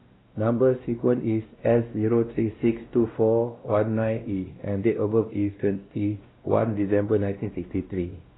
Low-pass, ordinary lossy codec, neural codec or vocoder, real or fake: 7.2 kHz; AAC, 16 kbps; codec, 16 kHz, 1 kbps, X-Codec, WavLM features, trained on Multilingual LibriSpeech; fake